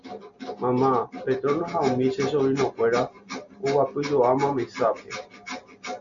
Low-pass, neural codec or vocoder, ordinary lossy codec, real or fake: 7.2 kHz; none; MP3, 64 kbps; real